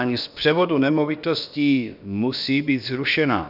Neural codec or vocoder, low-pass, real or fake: codec, 16 kHz, about 1 kbps, DyCAST, with the encoder's durations; 5.4 kHz; fake